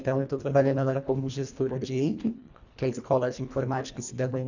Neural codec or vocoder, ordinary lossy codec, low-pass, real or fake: codec, 24 kHz, 1.5 kbps, HILCodec; none; 7.2 kHz; fake